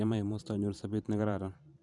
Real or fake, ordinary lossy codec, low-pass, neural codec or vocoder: fake; none; 10.8 kHz; vocoder, 44.1 kHz, 128 mel bands every 512 samples, BigVGAN v2